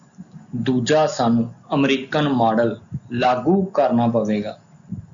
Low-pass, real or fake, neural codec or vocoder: 7.2 kHz; real; none